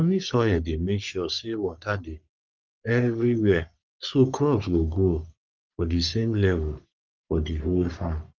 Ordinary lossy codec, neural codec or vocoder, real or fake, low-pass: Opus, 24 kbps; codec, 44.1 kHz, 3.4 kbps, Pupu-Codec; fake; 7.2 kHz